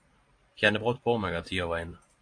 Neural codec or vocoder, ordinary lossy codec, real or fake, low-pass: vocoder, 44.1 kHz, 128 mel bands every 512 samples, BigVGAN v2; AAC, 48 kbps; fake; 9.9 kHz